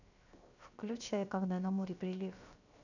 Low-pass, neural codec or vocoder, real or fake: 7.2 kHz; codec, 16 kHz, 0.7 kbps, FocalCodec; fake